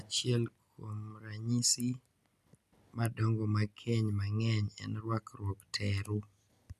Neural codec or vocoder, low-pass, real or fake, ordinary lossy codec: none; 14.4 kHz; real; none